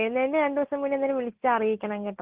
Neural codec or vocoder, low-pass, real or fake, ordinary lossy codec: none; 3.6 kHz; real; Opus, 16 kbps